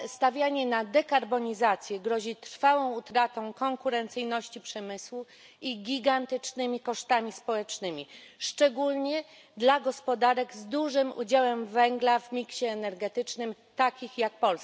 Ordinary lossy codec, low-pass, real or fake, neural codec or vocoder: none; none; real; none